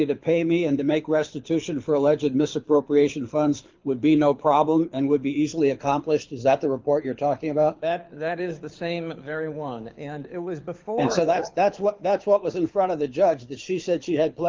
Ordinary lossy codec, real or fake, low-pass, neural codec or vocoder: Opus, 32 kbps; fake; 7.2 kHz; codec, 24 kHz, 6 kbps, HILCodec